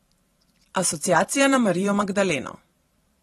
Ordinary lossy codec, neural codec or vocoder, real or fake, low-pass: AAC, 32 kbps; vocoder, 44.1 kHz, 128 mel bands every 512 samples, BigVGAN v2; fake; 19.8 kHz